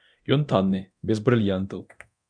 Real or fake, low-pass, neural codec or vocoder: fake; 9.9 kHz; codec, 24 kHz, 0.9 kbps, DualCodec